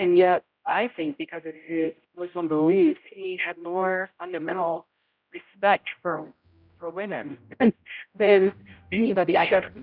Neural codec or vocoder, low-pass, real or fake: codec, 16 kHz, 0.5 kbps, X-Codec, HuBERT features, trained on general audio; 5.4 kHz; fake